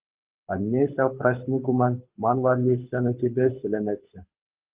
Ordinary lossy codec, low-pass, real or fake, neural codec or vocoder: Opus, 32 kbps; 3.6 kHz; fake; codec, 24 kHz, 6 kbps, HILCodec